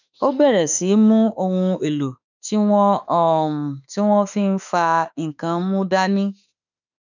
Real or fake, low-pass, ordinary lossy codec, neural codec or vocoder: fake; 7.2 kHz; none; autoencoder, 48 kHz, 32 numbers a frame, DAC-VAE, trained on Japanese speech